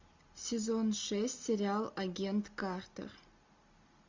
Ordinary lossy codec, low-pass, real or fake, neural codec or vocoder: MP3, 64 kbps; 7.2 kHz; real; none